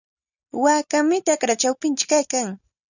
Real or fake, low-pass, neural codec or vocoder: real; 7.2 kHz; none